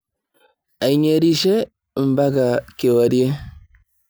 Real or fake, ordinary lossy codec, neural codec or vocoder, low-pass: real; none; none; none